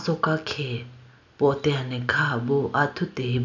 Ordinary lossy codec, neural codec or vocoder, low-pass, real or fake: none; none; 7.2 kHz; real